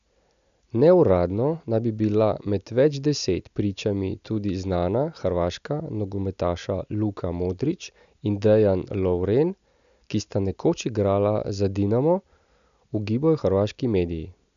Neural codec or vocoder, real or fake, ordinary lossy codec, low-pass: none; real; MP3, 96 kbps; 7.2 kHz